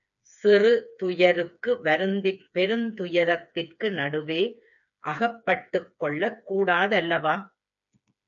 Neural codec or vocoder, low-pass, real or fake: codec, 16 kHz, 4 kbps, FreqCodec, smaller model; 7.2 kHz; fake